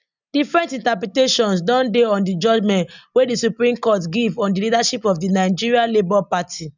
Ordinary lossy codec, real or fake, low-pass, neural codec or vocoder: none; real; 7.2 kHz; none